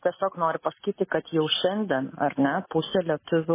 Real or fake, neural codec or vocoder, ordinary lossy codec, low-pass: real; none; MP3, 16 kbps; 3.6 kHz